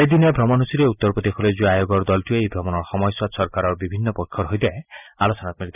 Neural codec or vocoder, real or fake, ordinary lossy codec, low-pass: none; real; none; 3.6 kHz